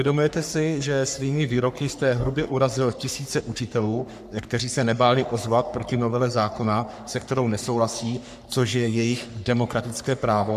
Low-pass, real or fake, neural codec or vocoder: 14.4 kHz; fake; codec, 44.1 kHz, 3.4 kbps, Pupu-Codec